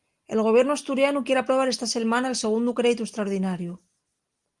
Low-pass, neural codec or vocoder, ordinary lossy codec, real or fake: 10.8 kHz; none; Opus, 24 kbps; real